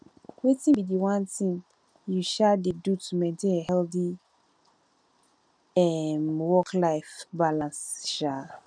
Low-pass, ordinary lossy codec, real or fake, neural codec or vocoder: 9.9 kHz; none; real; none